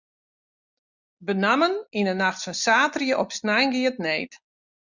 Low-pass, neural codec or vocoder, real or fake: 7.2 kHz; none; real